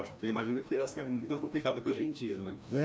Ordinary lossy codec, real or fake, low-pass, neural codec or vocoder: none; fake; none; codec, 16 kHz, 1 kbps, FreqCodec, larger model